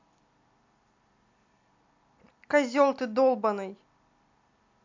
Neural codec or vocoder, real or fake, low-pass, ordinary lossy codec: none; real; 7.2 kHz; MP3, 64 kbps